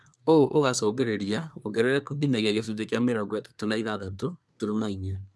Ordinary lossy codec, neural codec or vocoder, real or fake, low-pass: none; codec, 24 kHz, 1 kbps, SNAC; fake; none